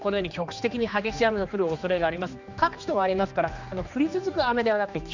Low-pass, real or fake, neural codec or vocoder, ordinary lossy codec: 7.2 kHz; fake; codec, 16 kHz, 2 kbps, X-Codec, HuBERT features, trained on general audio; none